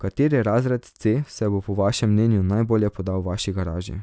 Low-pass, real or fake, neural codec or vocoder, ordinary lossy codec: none; real; none; none